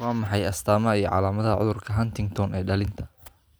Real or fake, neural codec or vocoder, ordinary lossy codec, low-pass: real; none; none; none